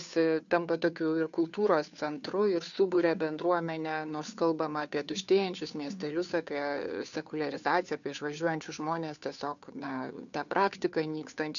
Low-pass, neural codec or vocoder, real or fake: 7.2 kHz; codec, 16 kHz, 2 kbps, FunCodec, trained on Chinese and English, 25 frames a second; fake